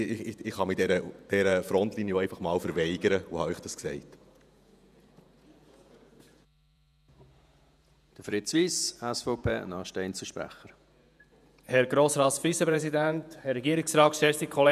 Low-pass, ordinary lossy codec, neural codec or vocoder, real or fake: 14.4 kHz; none; vocoder, 48 kHz, 128 mel bands, Vocos; fake